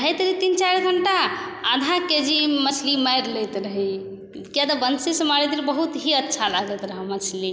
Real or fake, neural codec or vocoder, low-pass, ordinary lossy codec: real; none; none; none